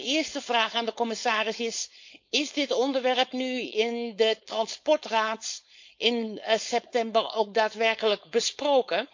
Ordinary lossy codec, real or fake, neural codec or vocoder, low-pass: MP3, 48 kbps; fake; codec, 16 kHz, 4.8 kbps, FACodec; 7.2 kHz